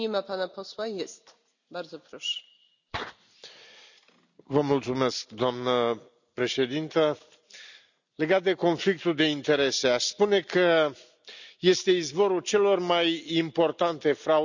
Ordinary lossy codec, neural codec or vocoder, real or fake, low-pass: none; none; real; 7.2 kHz